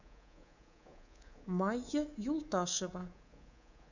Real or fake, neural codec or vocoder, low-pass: fake; codec, 24 kHz, 3.1 kbps, DualCodec; 7.2 kHz